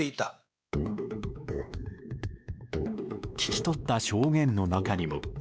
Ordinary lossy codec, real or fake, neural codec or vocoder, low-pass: none; fake; codec, 16 kHz, 2 kbps, X-Codec, WavLM features, trained on Multilingual LibriSpeech; none